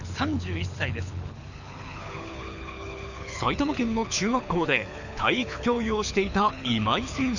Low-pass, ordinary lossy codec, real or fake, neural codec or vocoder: 7.2 kHz; none; fake; codec, 24 kHz, 6 kbps, HILCodec